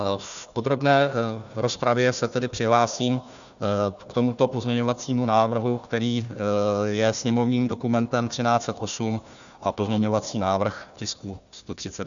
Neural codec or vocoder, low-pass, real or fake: codec, 16 kHz, 1 kbps, FunCodec, trained on Chinese and English, 50 frames a second; 7.2 kHz; fake